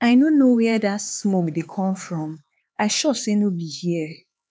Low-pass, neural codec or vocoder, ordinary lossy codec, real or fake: none; codec, 16 kHz, 4 kbps, X-Codec, HuBERT features, trained on LibriSpeech; none; fake